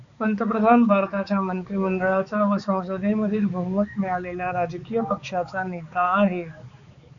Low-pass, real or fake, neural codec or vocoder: 7.2 kHz; fake; codec, 16 kHz, 4 kbps, X-Codec, HuBERT features, trained on balanced general audio